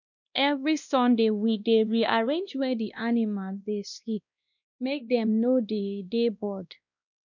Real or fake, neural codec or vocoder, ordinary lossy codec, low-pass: fake; codec, 16 kHz, 1 kbps, X-Codec, WavLM features, trained on Multilingual LibriSpeech; none; 7.2 kHz